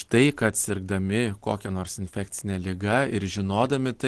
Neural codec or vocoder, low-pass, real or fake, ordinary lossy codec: none; 10.8 kHz; real; Opus, 16 kbps